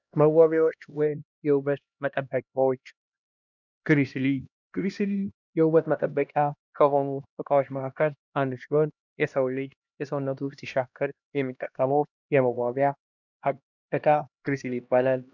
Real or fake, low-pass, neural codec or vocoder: fake; 7.2 kHz; codec, 16 kHz, 1 kbps, X-Codec, HuBERT features, trained on LibriSpeech